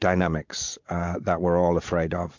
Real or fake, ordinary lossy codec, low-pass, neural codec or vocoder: fake; MP3, 64 kbps; 7.2 kHz; vocoder, 22.05 kHz, 80 mel bands, Vocos